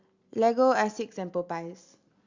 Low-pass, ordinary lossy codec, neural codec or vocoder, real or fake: 7.2 kHz; Opus, 64 kbps; none; real